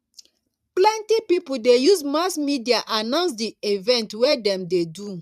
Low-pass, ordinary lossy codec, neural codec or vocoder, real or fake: 14.4 kHz; none; vocoder, 44.1 kHz, 128 mel bands every 512 samples, BigVGAN v2; fake